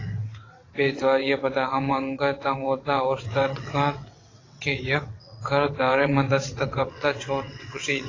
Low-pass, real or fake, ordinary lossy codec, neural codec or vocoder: 7.2 kHz; fake; AAC, 32 kbps; vocoder, 22.05 kHz, 80 mel bands, WaveNeXt